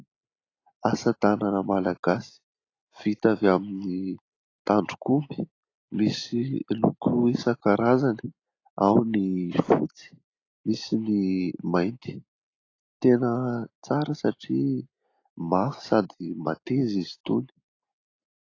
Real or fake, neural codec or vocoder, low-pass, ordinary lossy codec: real; none; 7.2 kHz; AAC, 32 kbps